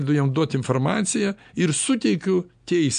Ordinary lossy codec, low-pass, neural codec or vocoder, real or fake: MP3, 64 kbps; 9.9 kHz; none; real